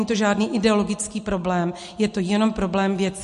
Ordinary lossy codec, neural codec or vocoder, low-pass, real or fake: MP3, 48 kbps; none; 14.4 kHz; real